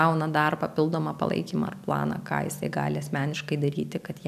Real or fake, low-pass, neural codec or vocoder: real; 14.4 kHz; none